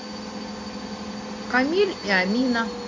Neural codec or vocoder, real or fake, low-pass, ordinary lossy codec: none; real; 7.2 kHz; AAC, 32 kbps